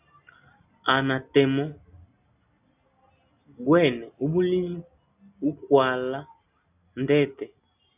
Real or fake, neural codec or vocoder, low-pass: real; none; 3.6 kHz